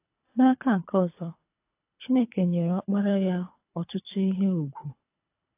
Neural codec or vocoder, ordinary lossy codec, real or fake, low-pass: codec, 24 kHz, 3 kbps, HILCodec; AAC, 24 kbps; fake; 3.6 kHz